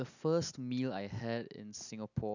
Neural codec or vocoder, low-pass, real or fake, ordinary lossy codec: none; 7.2 kHz; real; none